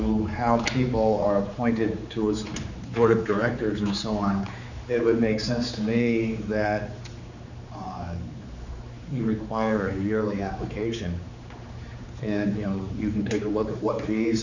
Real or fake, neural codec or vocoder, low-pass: fake; codec, 16 kHz, 4 kbps, X-Codec, HuBERT features, trained on general audio; 7.2 kHz